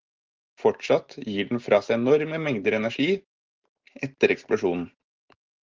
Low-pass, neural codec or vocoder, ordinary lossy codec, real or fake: 7.2 kHz; none; Opus, 16 kbps; real